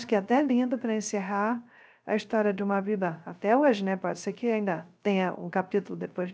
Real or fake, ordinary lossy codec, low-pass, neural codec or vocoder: fake; none; none; codec, 16 kHz, 0.3 kbps, FocalCodec